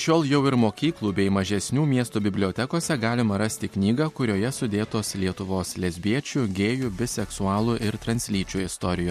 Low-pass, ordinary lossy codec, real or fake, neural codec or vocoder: 14.4 kHz; MP3, 64 kbps; real; none